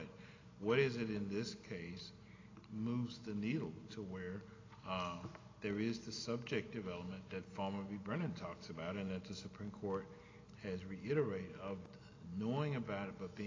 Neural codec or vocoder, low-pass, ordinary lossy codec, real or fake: none; 7.2 kHz; AAC, 32 kbps; real